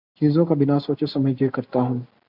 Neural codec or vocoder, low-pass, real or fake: none; 5.4 kHz; real